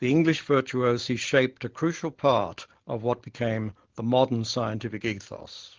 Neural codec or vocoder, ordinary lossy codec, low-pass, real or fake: none; Opus, 16 kbps; 7.2 kHz; real